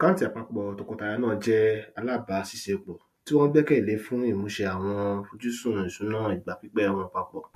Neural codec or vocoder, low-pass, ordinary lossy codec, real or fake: none; 14.4 kHz; MP3, 64 kbps; real